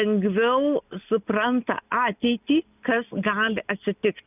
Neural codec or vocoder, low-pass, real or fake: none; 3.6 kHz; real